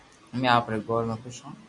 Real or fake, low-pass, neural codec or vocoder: real; 10.8 kHz; none